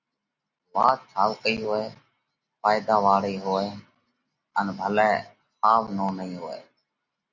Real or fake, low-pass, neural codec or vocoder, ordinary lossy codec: real; 7.2 kHz; none; Opus, 64 kbps